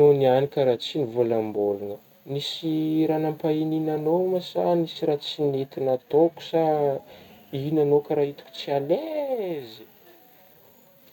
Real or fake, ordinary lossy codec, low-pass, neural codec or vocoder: real; none; 19.8 kHz; none